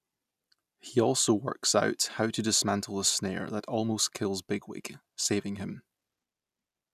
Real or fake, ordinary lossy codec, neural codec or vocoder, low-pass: real; none; none; 14.4 kHz